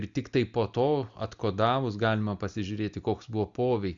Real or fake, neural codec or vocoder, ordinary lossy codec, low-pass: real; none; Opus, 64 kbps; 7.2 kHz